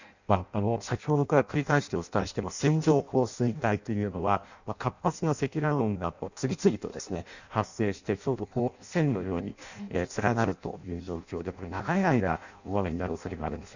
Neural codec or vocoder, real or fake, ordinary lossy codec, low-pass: codec, 16 kHz in and 24 kHz out, 0.6 kbps, FireRedTTS-2 codec; fake; none; 7.2 kHz